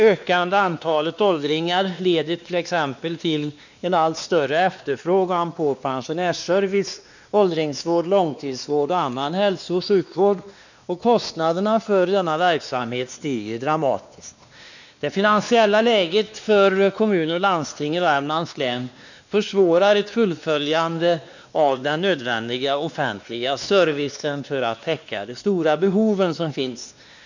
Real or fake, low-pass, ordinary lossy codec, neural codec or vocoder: fake; 7.2 kHz; none; codec, 16 kHz, 2 kbps, X-Codec, WavLM features, trained on Multilingual LibriSpeech